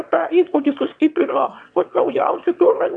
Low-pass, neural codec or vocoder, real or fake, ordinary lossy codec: 9.9 kHz; autoencoder, 22.05 kHz, a latent of 192 numbers a frame, VITS, trained on one speaker; fake; MP3, 64 kbps